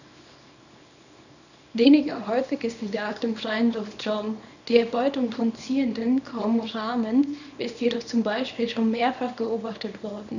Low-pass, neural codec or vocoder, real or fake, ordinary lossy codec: 7.2 kHz; codec, 24 kHz, 0.9 kbps, WavTokenizer, small release; fake; none